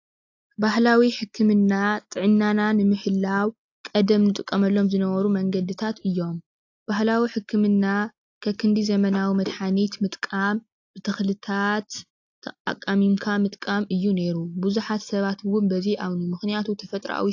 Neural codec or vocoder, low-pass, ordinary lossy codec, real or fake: none; 7.2 kHz; AAC, 48 kbps; real